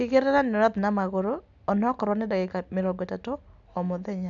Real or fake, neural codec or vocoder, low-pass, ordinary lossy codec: real; none; 7.2 kHz; none